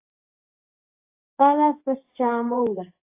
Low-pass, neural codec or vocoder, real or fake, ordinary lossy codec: 3.6 kHz; codec, 24 kHz, 0.9 kbps, WavTokenizer, medium speech release version 2; fake; AAC, 32 kbps